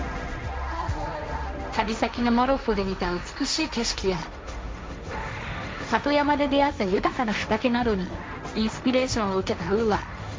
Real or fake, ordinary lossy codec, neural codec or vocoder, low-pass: fake; none; codec, 16 kHz, 1.1 kbps, Voila-Tokenizer; none